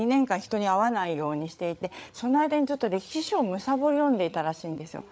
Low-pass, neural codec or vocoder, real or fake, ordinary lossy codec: none; codec, 16 kHz, 8 kbps, FreqCodec, larger model; fake; none